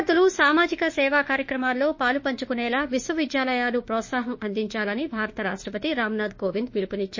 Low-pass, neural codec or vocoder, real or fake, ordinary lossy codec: 7.2 kHz; autoencoder, 48 kHz, 32 numbers a frame, DAC-VAE, trained on Japanese speech; fake; MP3, 32 kbps